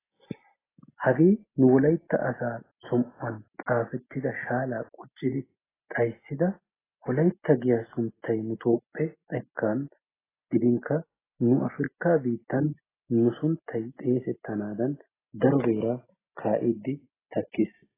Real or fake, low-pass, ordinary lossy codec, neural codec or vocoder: real; 3.6 kHz; AAC, 16 kbps; none